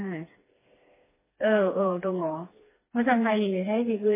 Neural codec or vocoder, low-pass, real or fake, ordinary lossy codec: codec, 16 kHz, 2 kbps, FreqCodec, smaller model; 3.6 kHz; fake; MP3, 16 kbps